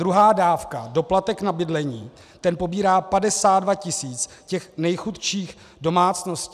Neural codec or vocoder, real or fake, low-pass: none; real; 14.4 kHz